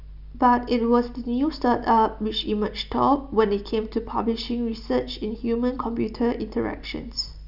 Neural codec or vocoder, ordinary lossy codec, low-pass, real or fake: none; none; 5.4 kHz; real